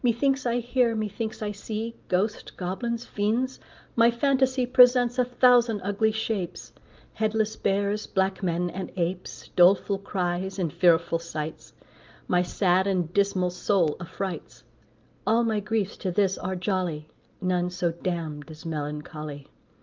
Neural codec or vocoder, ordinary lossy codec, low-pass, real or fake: none; Opus, 24 kbps; 7.2 kHz; real